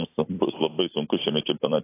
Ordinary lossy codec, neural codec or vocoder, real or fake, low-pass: AAC, 24 kbps; vocoder, 44.1 kHz, 128 mel bands every 256 samples, BigVGAN v2; fake; 3.6 kHz